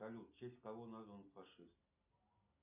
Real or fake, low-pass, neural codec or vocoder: real; 3.6 kHz; none